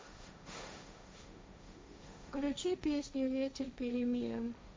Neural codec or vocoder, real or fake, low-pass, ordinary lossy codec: codec, 16 kHz, 1.1 kbps, Voila-Tokenizer; fake; none; none